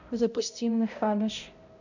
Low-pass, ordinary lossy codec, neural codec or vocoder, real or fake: 7.2 kHz; none; codec, 16 kHz, 0.5 kbps, X-Codec, HuBERT features, trained on balanced general audio; fake